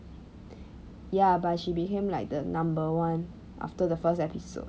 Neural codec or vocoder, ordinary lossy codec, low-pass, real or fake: none; none; none; real